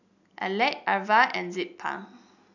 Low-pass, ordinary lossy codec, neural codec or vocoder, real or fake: 7.2 kHz; none; none; real